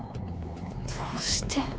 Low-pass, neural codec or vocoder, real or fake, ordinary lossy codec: none; codec, 16 kHz, 2 kbps, X-Codec, WavLM features, trained on Multilingual LibriSpeech; fake; none